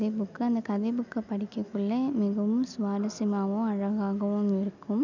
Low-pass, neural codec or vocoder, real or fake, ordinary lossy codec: 7.2 kHz; none; real; none